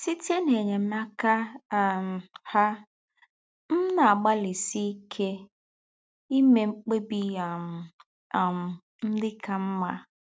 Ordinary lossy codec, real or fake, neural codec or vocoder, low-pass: none; real; none; none